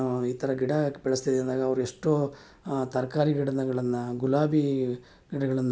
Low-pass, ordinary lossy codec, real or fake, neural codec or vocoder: none; none; real; none